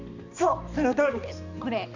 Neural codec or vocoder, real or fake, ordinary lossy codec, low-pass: codec, 16 kHz, 2 kbps, X-Codec, HuBERT features, trained on balanced general audio; fake; none; 7.2 kHz